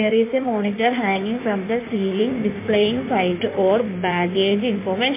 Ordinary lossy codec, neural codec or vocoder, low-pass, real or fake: MP3, 24 kbps; codec, 16 kHz in and 24 kHz out, 1.1 kbps, FireRedTTS-2 codec; 3.6 kHz; fake